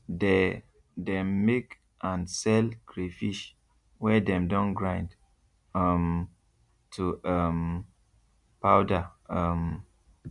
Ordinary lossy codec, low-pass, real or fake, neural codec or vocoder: MP3, 96 kbps; 10.8 kHz; real; none